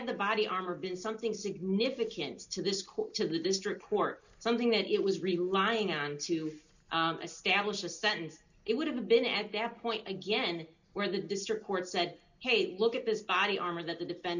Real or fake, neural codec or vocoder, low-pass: real; none; 7.2 kHz